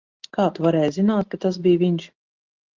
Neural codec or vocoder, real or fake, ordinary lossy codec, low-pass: none; real; Opus, 32 kbps; 7.2 kHz